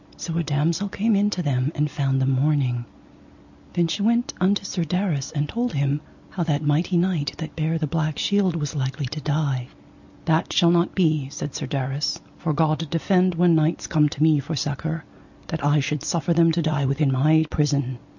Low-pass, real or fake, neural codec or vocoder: 7.2 kHz; real; none